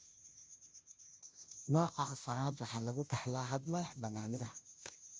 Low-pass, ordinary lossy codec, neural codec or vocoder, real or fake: none; none; codec, 16 kHz, 0.5 kbps, FunCodec, trained on Chinese and English, 25 frames a second; fake